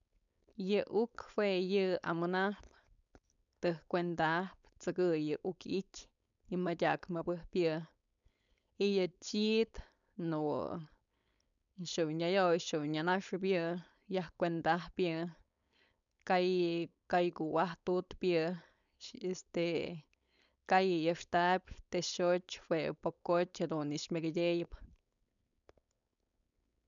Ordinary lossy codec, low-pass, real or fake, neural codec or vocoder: none; 7.2 kHz; fake; codec, 16 kHz, 4.8 kbps, FACodec